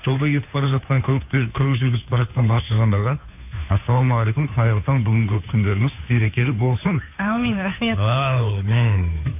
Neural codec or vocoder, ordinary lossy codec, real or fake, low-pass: codec, 16 kHz, 2 kbps, FunCodec, trained on Chinese and English, 25 frames a second; AAC, 32 kbps; fake; 3.6 kHz